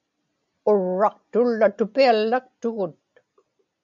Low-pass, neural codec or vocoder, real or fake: 7.2 kHz; none; real